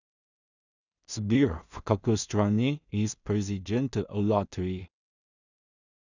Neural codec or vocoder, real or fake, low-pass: codec, 16 kHz in and 24 kHz out, 0.4 kbps, LongCat-Audio-Codec, two codebook decoder; fake; 7.2 kHz